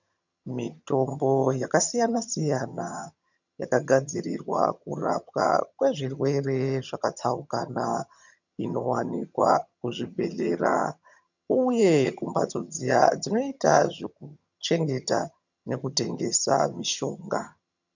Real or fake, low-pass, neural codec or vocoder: fake; 7.2 kHz; vocoder, 22.05 kHz, 80 mel bands, HiFi-GAN